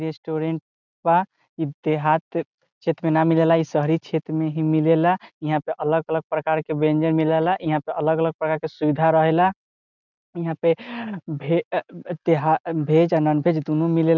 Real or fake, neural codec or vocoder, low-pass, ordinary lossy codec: real; none; 7.2 kHz; none